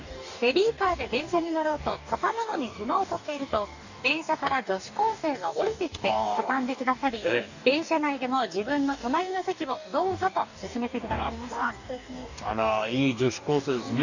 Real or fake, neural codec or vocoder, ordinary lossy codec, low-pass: fake; codec, 44.1 kHz, 2.6 kbps, DAC; none; 7.2 kHz